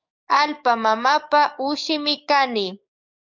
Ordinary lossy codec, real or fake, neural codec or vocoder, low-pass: MP3, 64 kbps; fake; codec, 44.1 kHz, 7.8 kbps, DAC; 7.2 kHz